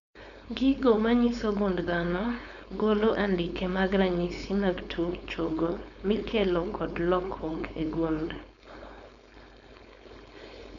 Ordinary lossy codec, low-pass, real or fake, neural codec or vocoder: none; 7.2 kHz; fake; codec, 16 kHz, 4.8 kbps, FACodec